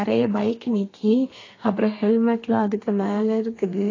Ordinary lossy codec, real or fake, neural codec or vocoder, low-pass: AAC, 32 kbps; fake; codec, 32 kHz, 1.9 kbps, SNAC; 7.2 kHz